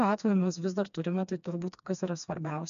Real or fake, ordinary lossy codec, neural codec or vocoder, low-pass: fake; MP3, 96 kbps; codec, 16 kHz, 2 kbps, FreqCodec, smaller model; 7.2 kHz